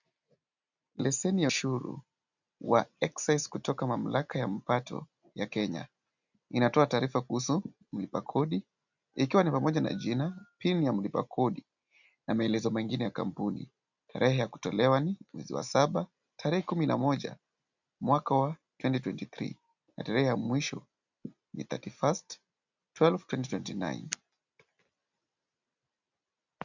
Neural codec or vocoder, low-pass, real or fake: none; 7.2 kHz; real